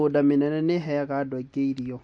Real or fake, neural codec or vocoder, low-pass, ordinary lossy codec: real; none; 9.9 kHz; MP3, 48 kbps